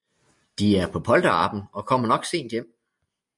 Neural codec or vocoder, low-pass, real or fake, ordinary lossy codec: none; 10.8 kHz; real; MP3, 64 kbps